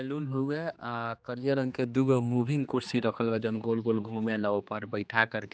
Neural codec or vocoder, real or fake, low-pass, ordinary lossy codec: codec, 16 kHz, 2 kbps, X-Codec, HuBERT features, trained on general audio; fake; none; none